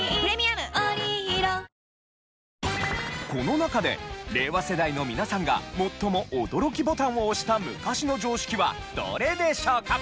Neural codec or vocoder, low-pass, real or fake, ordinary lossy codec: none; none; real; none